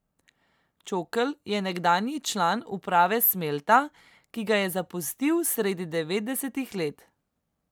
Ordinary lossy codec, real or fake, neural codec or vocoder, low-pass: none; real; none; none